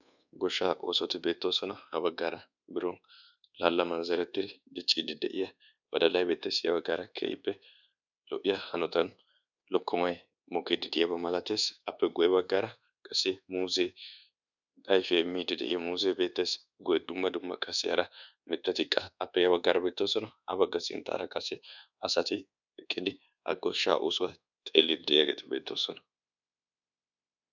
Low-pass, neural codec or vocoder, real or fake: 7.2 kHz; codec, 24 kHz, 1.2 kbps, DualCodec; fake